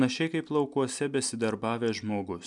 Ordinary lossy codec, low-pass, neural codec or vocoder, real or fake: MP3, 96 kbps; 10.8 kHz; none; real